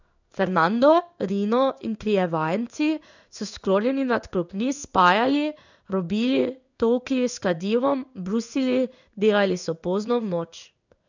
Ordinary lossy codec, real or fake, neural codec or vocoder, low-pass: none; fake; codec, 16 kHz in and 24 kHz out, 1 kbps, XY-Tokenizer; 7.2 kHz